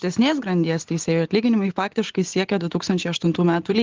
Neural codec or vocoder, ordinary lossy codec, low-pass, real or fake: none; Opus, 16 kbps; 7.2 kHz; real